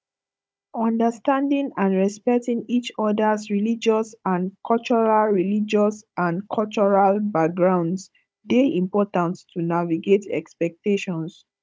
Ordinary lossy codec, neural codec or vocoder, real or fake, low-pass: none; codec, 16 kHz, 16 kbps, FunCodec, trained on Chinese and English, 50 frames a second; fake; none